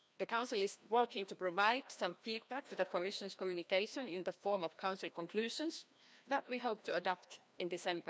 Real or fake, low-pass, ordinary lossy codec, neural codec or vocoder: fake; none; none; codec, 16 kHz, 1 kbps, FreqCodec, larger model